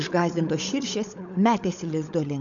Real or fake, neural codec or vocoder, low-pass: fake; codec, 16 kHz, 16 kbps, FunCodec, trained on LibriTTS, 50 frames a second; 7.2 kHz